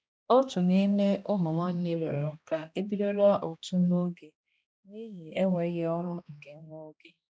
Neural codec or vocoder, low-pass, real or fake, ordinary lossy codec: codec, 16 kHz, 1 kbps, X-Codec, HuBERT features, trained on balanced general audio; none; fake; none